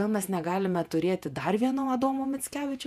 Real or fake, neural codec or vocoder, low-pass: fake; vocoder, 48 kHz, 128 mel bands, Vocos; 14.4 kHz